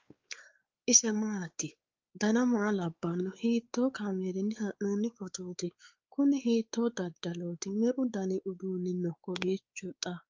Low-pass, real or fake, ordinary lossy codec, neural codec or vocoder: 7.2 kHz; fake; Opus, 24 kbps; codec, 16 kHz, 4 kbps, X-Codec, WavLM features, trained on Multilingual LibriSpeech